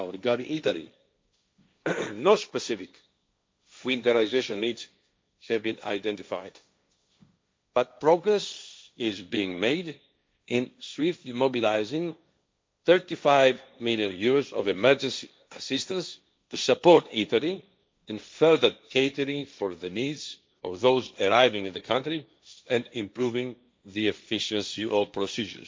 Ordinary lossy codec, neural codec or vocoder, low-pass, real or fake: none; codec, 16 kHz, 1.1 kbps, Voila-Tokenizer; none; fake